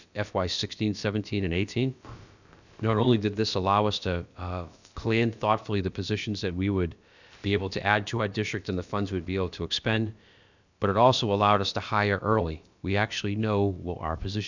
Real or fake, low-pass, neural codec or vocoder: fake; 7.2 kHz; codec, 16 kHz, about 1 kbps, DyCAST, with the encoder's durations